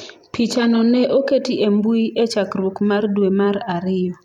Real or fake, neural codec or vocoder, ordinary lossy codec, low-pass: fake; vocoder, 44.1 kHz, 128 mel bands every 256 samples, BigVGAN v2; none; 19.8 kHz